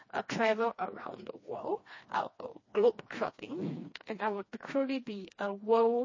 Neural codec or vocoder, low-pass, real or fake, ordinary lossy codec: codec, 16 kHz, 2 kbps, FreqCodec, smaller model; 7.2 kHz; fake; MP3, 32 kbps